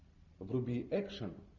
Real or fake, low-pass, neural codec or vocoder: real; 7.2 kHz; none